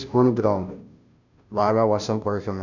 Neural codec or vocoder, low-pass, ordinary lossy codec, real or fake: codec, 16 kHz, 0.5 kbps, FunCodec, trained on Chinese and English, 25 frames a second; 7.2 kHz; none; fake